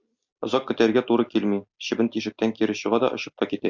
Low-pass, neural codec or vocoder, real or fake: 7.2 kHz; none; real